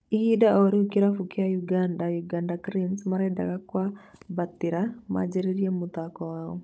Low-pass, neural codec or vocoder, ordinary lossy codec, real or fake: none; codec, 16 kHz, 16 kbps, FunCodec, trained on Chinese and English, 50 frames a second; none; fake